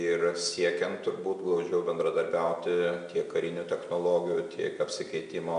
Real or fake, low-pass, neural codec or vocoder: real; 9.9 kHz; none